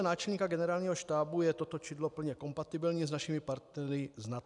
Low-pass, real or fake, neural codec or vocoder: 10.8 kHz; real; none